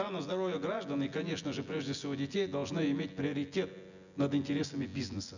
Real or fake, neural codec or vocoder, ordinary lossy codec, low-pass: fake; vocoder, 24 kHz, 100 mel bands, Vocos; none; 7.2 kHz